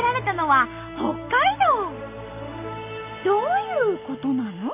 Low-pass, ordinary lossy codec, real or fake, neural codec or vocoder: 3.6 kHz; AAC, 32 kbps; real; none